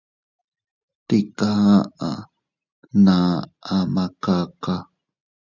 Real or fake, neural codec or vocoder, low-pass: real; none; 7.2 kHz